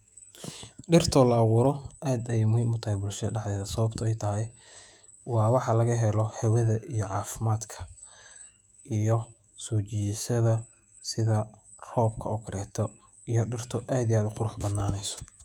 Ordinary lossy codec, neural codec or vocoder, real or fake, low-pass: none; autoencoder, 48 kHz, 128 numbers a frame, DAC-VAE, trained on Japanese speech; fake; 19.8 kHz